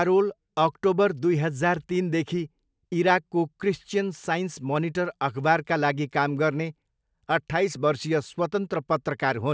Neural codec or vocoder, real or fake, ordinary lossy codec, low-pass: none; real; none; none